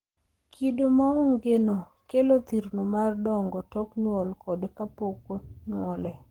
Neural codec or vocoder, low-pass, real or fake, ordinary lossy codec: codec, 44.1 kHz, 7.8 kbps, Pupu-Codec; 19.8 kHz; fake; Opus, 24 kbps